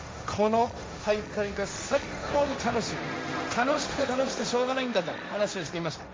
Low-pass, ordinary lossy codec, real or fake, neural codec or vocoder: none; none; fake; codec, 16 kHz, 1.1 kbps, Voila-Tokenizer